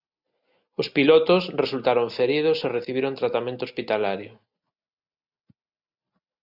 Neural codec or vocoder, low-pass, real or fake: none; 5.4 kHz; real